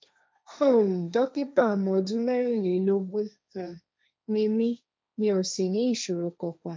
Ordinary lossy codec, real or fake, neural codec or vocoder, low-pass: none; fake; codec, 16 kHz, 1.1 kbps, Voila-Tokenizer; none